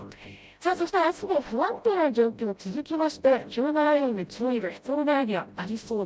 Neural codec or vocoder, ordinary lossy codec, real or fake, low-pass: codec, 16 kHz, 0.5 kbps, FreqCodec, smaller model; none; fake; none